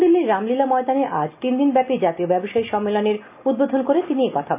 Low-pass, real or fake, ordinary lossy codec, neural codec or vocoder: 3.6 kHz; real; AAC, 32 kbps; none